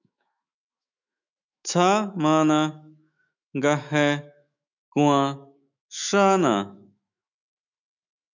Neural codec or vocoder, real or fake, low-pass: autoencoder, 48 kHz, 128 numbers a frame, DAC-VAE, trained on Japanese speech; fake; 7.2 kHz